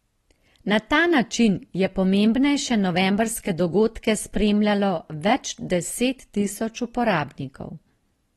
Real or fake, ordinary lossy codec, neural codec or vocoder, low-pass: real; AAC, 32 kbps; none; 19.8 kHz